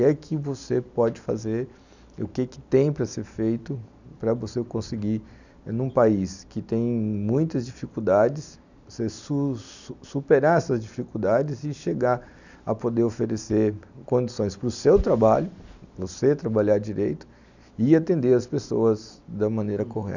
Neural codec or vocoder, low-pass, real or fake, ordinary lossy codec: none; 7.2 kHz; real; none